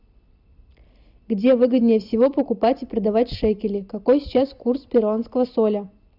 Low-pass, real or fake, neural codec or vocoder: 5.4 kHz; real; none